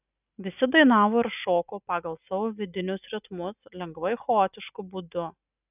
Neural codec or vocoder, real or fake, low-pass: none; real; 3.6 kHz